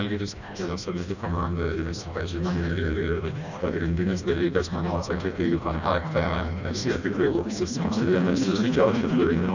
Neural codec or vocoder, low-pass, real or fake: codec, 16 kHz, 1 kbps, FreqCodec, smaller model; 7.2 kHz; fake